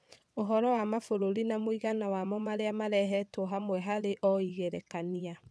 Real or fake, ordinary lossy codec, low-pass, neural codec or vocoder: fake; none; none; vocoder, 22.05 kHz, 80 mel bands, WaveNeXt